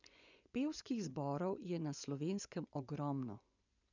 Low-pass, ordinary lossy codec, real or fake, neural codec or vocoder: 7.2 kHz; none; fake; vocoder, 24 kHz, 100 mel bands, Vocos